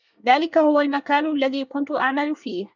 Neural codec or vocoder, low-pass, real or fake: codec, 24 kHz, 1 kbps, SNAC; 7.2 kHz; fake